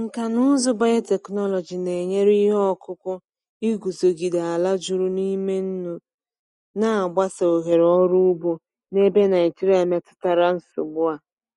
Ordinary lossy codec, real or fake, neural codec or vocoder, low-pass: MP3, 48 kbps; real; none; 19.8 kHz